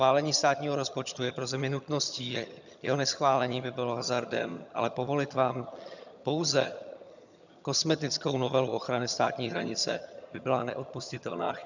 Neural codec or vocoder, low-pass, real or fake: vocoder, 22.05 kHz, 80 mel bands, HiFi-GAN; 7.2 kHz; fake